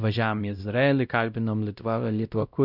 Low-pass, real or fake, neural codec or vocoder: 5.4 kHz; fake; codec, 16 kHz, 0.5 kbps, X-Codec, WavLM features, trained on Multilingual LibriSpeech